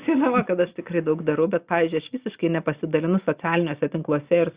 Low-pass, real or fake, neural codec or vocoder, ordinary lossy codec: 3.6 kHz; real; none; Opus, 64 kbps